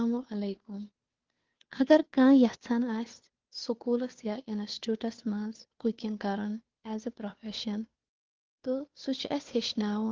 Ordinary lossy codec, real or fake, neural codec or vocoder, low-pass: Opus, 16 kbps; fake; codec, 16 kHz, 2 kbps, FunCodec, trained on Chinese and English, 25 frames a second; 7.2 kHz